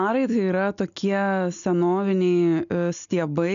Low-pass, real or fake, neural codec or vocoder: 7.2 kHz; real; none